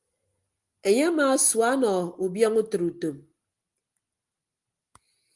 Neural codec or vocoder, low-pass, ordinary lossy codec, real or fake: none; 10.8 kHz; Opus, 32 kbps; real